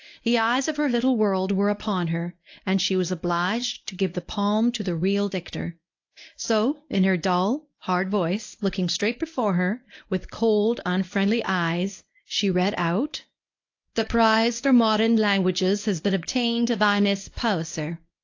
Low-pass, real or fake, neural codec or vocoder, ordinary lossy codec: 7.2 kHz; fake; codec, 24 kHz, 0.9 kbps, WavTokenizer, medium speech release version 1; AAC, 48 kbps